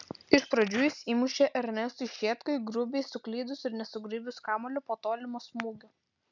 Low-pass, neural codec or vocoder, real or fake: 7.2 kHz; none; real